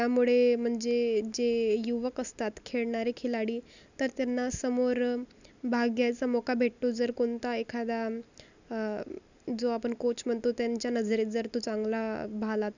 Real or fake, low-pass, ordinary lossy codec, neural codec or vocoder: real; 7.2 kHz; none; none